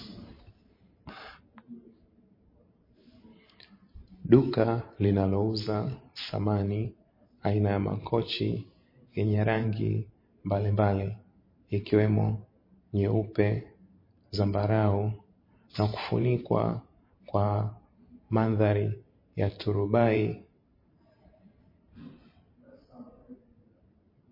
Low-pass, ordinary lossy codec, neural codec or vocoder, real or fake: 5.4 kHz; MP3, 24 kbps; vocoder, 44.1 kHz, 128 mel bands every 512 samples, BigVGAN v2; fake